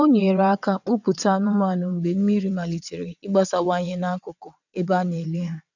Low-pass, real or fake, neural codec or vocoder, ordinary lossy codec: 7.2 kHz; fake; vocoder, 22.05 kHz, 80 mel bands, WaveNeXt; none